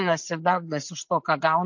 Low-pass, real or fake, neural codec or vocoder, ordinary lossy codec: 7.2 kHz; real; none; MP3, 48 kbps